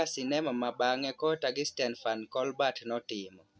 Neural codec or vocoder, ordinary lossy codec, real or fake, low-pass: none; none; real; none